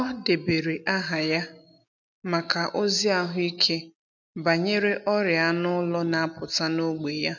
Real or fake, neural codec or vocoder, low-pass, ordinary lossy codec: real; none; 7.2 kHz; none